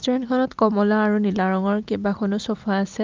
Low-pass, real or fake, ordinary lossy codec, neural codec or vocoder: 7.2 kHz; real; Opus, 32 kbps; none